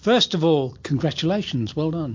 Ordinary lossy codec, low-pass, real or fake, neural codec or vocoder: MP3, 48 kbps; 7.2 kHz; real; none